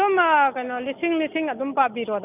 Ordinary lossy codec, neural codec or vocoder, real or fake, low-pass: none; none; real; 3.6 kHz